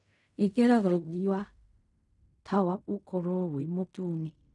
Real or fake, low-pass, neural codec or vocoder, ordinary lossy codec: fake; 10.8 kHz; codec, 16 kHz in and 24 kHz out, 0.4 kbps, LongCat-Audio-Codec, fine tuned four codebook decoder; none